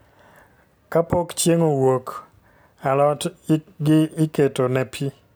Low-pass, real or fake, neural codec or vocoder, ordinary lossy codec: none; real; none; none